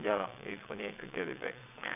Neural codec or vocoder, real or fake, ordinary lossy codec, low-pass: vocoder, 22.05 kHz, 80 mel bands, WaveNeXt; fake; none; 3.6 kHz